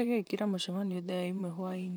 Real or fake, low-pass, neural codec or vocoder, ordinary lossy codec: fake; none; vocoder, 44.1 kHz, 128 mel bands, Pupu-Vocoder; none